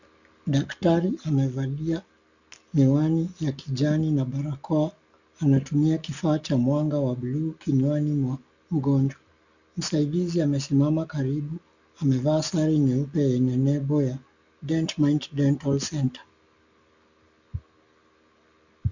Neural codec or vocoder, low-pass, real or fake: none; 7.2 kHz; real